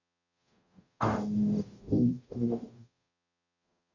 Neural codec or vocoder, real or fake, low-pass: codec, 44.1 kHz, 0.9 kbps, DAC; fake; 7.2 kHz